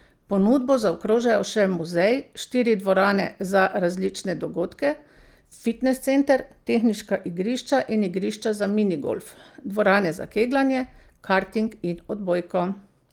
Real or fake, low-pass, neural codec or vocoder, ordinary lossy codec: real; 19.8 kHz; none; Opus, 24 kbps